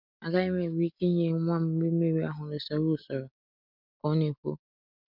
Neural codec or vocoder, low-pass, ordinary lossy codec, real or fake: none; 5.4 kHz; MP3, 48 kbps; real